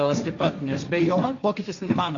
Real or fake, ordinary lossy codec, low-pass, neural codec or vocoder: fake; Opus, 64 kbps; 7.2 kHz; codec, 16 kHz, 1.1 kbps, Voila-Tokenizer